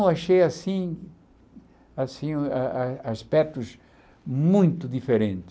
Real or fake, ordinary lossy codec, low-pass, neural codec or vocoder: real; none; none; none